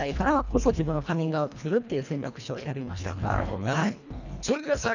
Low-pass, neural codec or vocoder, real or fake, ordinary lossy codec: 7.2 kHz; codec, 24 kHz, 1.5 kbps, HILCodec; fake; none